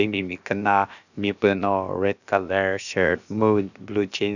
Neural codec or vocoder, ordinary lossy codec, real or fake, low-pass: codec, 16 kHz, about 1 kbps, DyCAST, with the encoder's durations; none; fake; 7.2 kHz